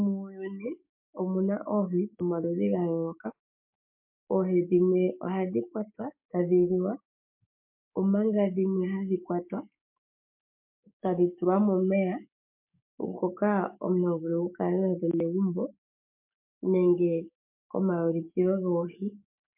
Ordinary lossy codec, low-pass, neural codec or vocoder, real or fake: MP3, 32 kbps; 3.6 kHz; codec, 16 kHz, 6 kbps, DAC; fake